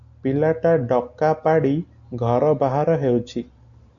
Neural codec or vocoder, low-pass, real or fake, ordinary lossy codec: none; 7.2 kHz; real; AAC, 64 kbps